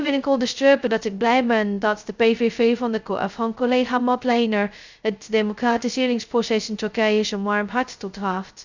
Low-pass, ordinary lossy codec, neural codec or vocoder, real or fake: 7.2 kHz; Opus, 64 kbps; codec, 16 kHz, 0.2 kbps, FocalCodec; fake